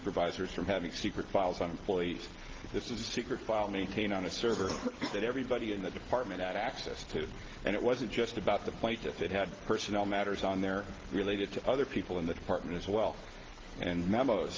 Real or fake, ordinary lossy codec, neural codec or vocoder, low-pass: real; Opus, 16 kbps; none; 7.2 kHz